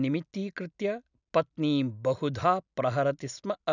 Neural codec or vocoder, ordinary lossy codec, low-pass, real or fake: none; none; 7.2 kHz; real